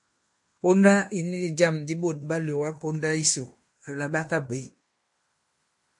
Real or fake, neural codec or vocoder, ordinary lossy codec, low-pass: fake; codec, 16 kHz in and 24 kHz out, 0.9 kbps, LongCat-Audio-Codec, fine tuned four codebook decoder; MP3, 48 kbps; 10.8 kHz